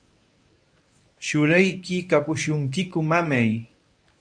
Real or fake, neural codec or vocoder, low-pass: fake; codec, 24 kHz, 0.9 kbps, WavTokenizer, medium speech release version 1; 9.9 kHz